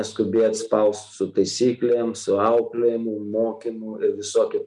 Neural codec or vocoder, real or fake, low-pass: none; real; 10.8 kHz